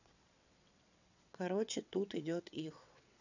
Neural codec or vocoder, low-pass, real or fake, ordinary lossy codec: vocoder, 22.05 kHz, 80 mel bands, WaveNeXt; 7.2 kHz; fake; none